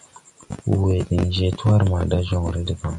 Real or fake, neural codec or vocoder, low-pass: real; none; 10.8 kHz